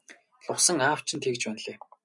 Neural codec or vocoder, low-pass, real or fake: none; 10.8 kHz; real